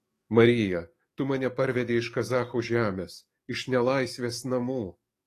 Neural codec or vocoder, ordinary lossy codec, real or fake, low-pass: codec, 44.1 kHz, 7.8 kbps, DAC; AAC, 48 kbps; fake; 14.4 kHz